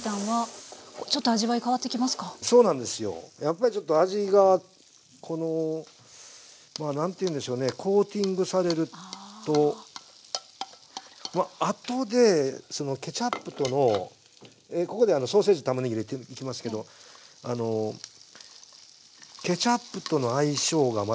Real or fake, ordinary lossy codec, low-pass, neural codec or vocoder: real; none; none; none